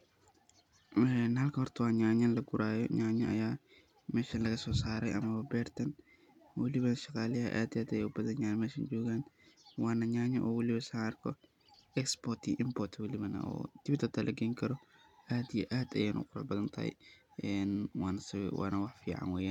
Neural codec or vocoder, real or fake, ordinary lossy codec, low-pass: none; real; none; 19.8 kHz